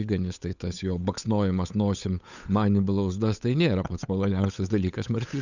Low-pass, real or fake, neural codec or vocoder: 7.2 kHz; fake; codec, 16 kHz, 8 kbps, FunCodec, trained on Chinese and English, 25 frames a second